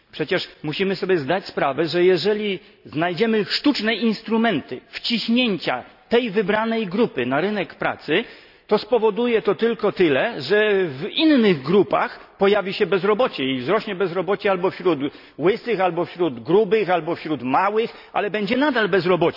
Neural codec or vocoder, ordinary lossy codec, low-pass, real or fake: none; none; 5.4 kHz; real